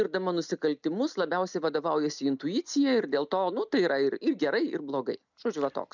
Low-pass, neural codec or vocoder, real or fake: 7.2 kHz; none; real